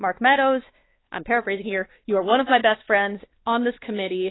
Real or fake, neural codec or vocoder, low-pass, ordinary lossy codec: fake; codec, 16 kHz, 2 kbps, X-Codec, WavLM features, trained on Multilingual LibriSpeech; 7.2 kHz; AAC, 16 kbps